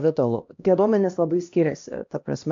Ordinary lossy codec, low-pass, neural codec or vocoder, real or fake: AAC, 64 kbps; 7.2 kHz; codec, 16 kHz, 1 kbps, X-Codec, HuBERT features, trained on LibriSpeech; fake